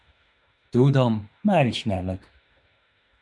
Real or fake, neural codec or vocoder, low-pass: fake; autoencoder, 48 kHz, 32 numbers a frame, DAC-VAE, trained on Japanese speech; 10.8 kHz